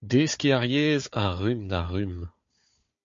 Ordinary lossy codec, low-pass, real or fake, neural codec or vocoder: MP3, 48 kbps; 7.2 kHz; fake; codec, 16 kHz, 4 kbps, FunCodec, trained on Chinese and English, 50 frames a second